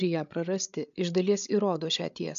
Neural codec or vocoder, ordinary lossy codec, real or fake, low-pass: codec, 16 kHz, 8 kbps, FreqCodec, larger model; MP3, 64 kbps; fake; 7.2 kHz